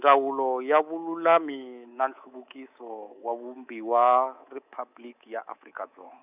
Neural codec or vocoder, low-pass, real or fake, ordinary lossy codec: codec, 24 kHz, 3.1 kbps, DualCodec; 3.6 kHz; fake; none